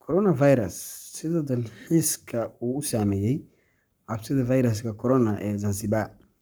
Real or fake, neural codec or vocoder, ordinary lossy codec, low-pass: fake; codec, 44.1 kHz, 7.8 kbps, Pupu-Codec; none; none